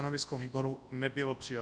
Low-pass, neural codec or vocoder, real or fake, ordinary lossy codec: 9.9 kHz; codec, 24 kHz, 0.9 kbps, WavTokenizer, large speech release; fake; Opus, 64 kbps